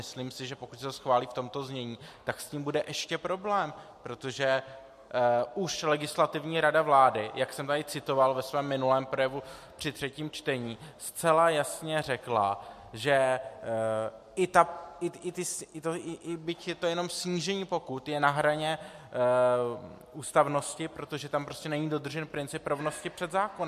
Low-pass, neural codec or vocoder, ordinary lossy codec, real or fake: 14.4 kHz; none; MP3, 64 kbps; real